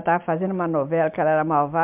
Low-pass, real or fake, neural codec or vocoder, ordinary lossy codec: 3.6 kHz; real; none; MP3, 32 kbps